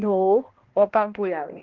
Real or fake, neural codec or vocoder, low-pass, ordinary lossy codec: fake; codec, 16 kHz, 1 kbps, X-Codec, HuBERT features, trained on balanced general audio; 7.2 kHz; Opus, 16 kbps